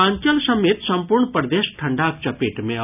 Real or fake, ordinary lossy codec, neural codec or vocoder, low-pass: real; none; none; 3.6 kHz